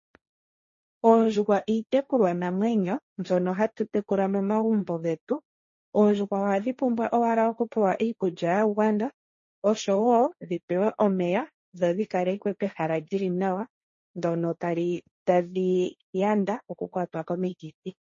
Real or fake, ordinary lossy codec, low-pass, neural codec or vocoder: fake; MP3, 32 kbps; 7.2 kHz; codec, 16 kHz, 1.1 kbps, Voila-Tokenizer